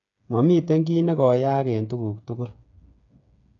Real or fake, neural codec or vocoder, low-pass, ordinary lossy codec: fake; codec, 16 kHz, 8 kbps, FreqCodec, smaller model; 7.2 kHz; none